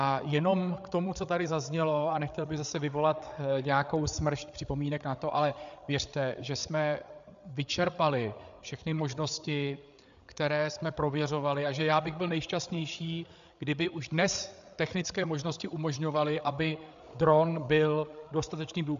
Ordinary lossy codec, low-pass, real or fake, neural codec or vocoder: AAC, 96 kbps; 7.2 kHz; fake; codec, 16 kHz, 8 kbps, FreqCodec, larger model